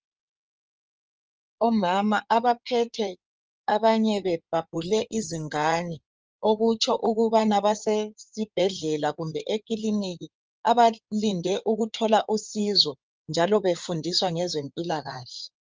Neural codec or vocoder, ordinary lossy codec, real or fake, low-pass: codec, 16 kHz in and 24 kHz out, 2.2 kbps, FireRedTTS-2 codec; Opus, 24 kbps; fake; 7.2 kHz